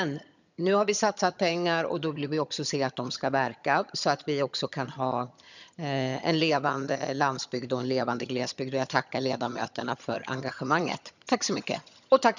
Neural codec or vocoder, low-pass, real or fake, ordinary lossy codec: vocoder, 22.05 kHz, 80 mel bands, HiFi-GAN; 7.2 kHz; fake; none